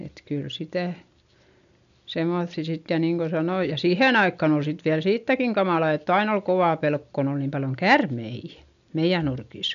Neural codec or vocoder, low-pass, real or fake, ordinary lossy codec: none; 7.2 kHz; real; none